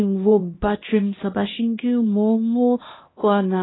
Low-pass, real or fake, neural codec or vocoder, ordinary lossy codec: 7.2 kHz; fake; codec, 16 kHz, 1 kbps, X-Codec, HuBERT features, trained on LibriSpeech; AAC, 16 kbps